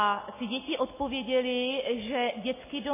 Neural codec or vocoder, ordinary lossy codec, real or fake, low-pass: none; MP3, 16 kbps; real; 3.6 kHz